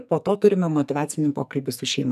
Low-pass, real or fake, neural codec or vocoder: 14.4 kHz; fake; codec, 44.1 kHz, 2.6 kbps, SNAC